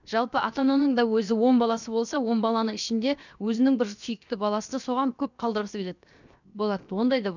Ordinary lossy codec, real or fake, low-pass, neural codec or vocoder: none; fake; 7.2 kHz; codec, 16 kHz, 0.7 kbps, FocalCodec